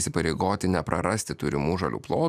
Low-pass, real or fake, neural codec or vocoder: 14.4 kHz; real; none